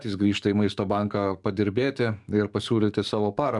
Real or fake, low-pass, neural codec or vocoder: fake; 10.8 kHz; codec, 44.1 kHz, 7.8 kbps, DAC